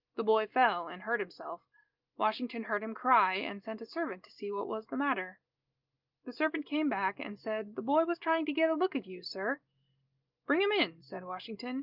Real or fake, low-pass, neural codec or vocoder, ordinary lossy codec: real; 5.4 kHz; none; Opus, 24 kbps